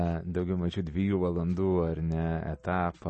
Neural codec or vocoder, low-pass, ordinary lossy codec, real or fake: none; 9.9 kHz; MP3, 32 kbps; real